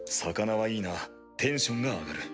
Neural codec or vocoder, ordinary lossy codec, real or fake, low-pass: none; none; real; none